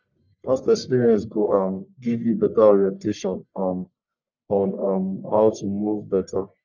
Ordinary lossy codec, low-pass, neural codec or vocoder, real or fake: none; 7.2 kHz; codec, 44.1 kHz, 1.7 kbps, Pupu-Codec; fake